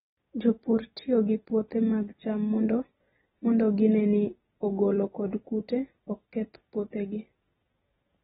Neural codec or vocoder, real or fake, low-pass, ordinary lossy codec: none; real; 19.8 kHz; AAC, 16 kbps